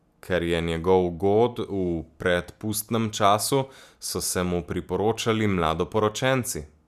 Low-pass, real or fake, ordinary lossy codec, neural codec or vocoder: 14.4 kHz; real; none; none